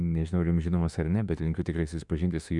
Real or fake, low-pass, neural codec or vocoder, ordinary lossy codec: fake; 10.8 kHz; autoencoder, 48 kHz, 32 numbers a frame, DAC-VAE, trained on Japanese speech; MP3, 96 kbps